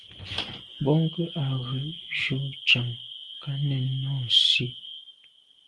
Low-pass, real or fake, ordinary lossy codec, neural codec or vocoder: 10.8 kHz; real; Opus, 24 kbps; none